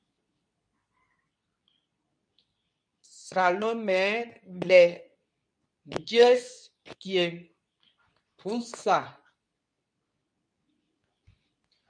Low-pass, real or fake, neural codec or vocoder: 9.9 kHz; fake; codec, 24 kHz, 0.9 kbps, WavTokenizer, medium speech release version 2